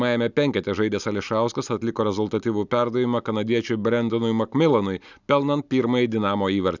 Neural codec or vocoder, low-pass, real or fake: none; 7.2 kHz; real